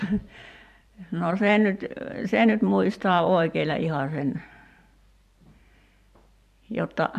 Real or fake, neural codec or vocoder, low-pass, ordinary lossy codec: fake; vocoder, 44.1 kHz, 128 mel bands every 512 samples, BigVGAN v2; 14.4 kHz; MP3, 96 kbps